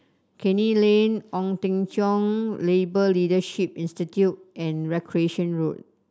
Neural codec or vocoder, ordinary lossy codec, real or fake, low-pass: none; none; real; none